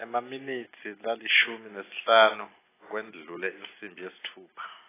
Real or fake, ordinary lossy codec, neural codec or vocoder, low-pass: real; AAC, 16 kbps; none; 3.6 kHz